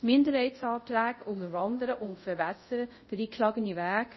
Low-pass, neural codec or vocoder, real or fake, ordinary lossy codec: 7.2 kHz; codec, 24 kHz, 0.5 kbps, DualCodec; fake; MP3, 24 kbps